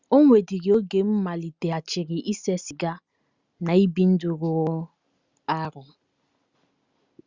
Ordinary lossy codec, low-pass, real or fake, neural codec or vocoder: Opus, 64 kbps; 7.2 kHz; real; none